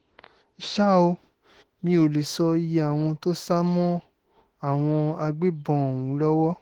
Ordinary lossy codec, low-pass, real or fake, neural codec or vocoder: Opus, 16 kbps; 19.8 kHz; fake; autoencoder, 48 kHz, 32 numbers a frame, DAC-VAE, trained on Japanese speech